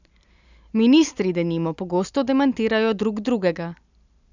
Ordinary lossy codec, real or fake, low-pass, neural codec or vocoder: none; real; 7.2 kHz; none